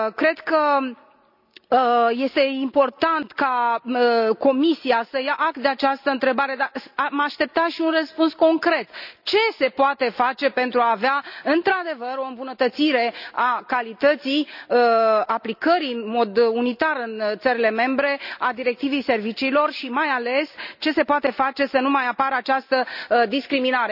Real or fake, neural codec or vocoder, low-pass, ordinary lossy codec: real; none; 5.4 kHz; none